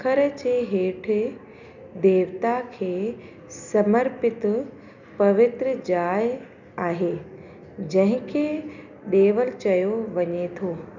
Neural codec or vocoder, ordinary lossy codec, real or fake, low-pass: none; none; real; 7.2 kHz